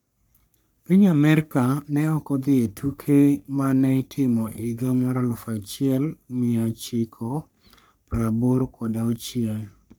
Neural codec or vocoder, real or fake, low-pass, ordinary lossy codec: codec, 44.1 kHz, 3.4 kbps, Pupu-Codec; fake; none; none